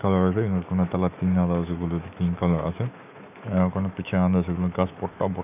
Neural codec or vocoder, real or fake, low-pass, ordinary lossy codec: autoencoder, 48 kHz, 128 numbers a frame, DAC-VAE, trained on Japanese speech; fake; 3.6 kHz; none